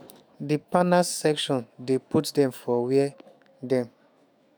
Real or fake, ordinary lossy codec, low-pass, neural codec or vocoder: fake; none; none; autoencoder, 48 kHz, 128 numbers a frame, DAC-VAE, trained on Japanese speech